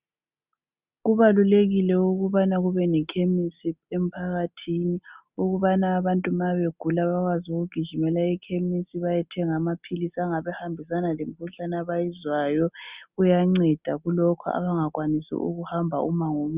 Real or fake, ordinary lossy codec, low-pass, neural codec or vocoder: real; Opus, 64 kbps; 3.6 kHz; none